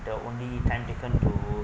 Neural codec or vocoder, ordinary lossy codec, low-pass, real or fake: none; none; none; real